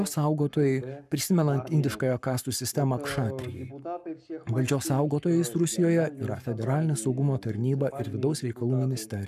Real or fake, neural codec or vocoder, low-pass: fake; codec, 44.1 kHz, 7.8 kbps, Pupu-Codec; 14.4 kHz